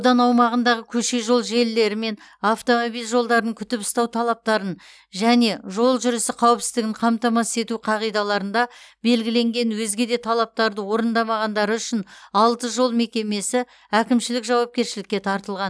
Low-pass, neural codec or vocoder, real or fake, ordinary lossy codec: none; none; real; none